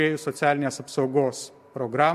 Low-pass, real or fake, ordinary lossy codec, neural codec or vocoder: 14.4 kHz; real; MP3, 64 kbps; none